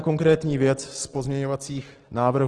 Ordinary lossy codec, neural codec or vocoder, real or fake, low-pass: Opus, 16 kbps; none; real; 10.8 kHz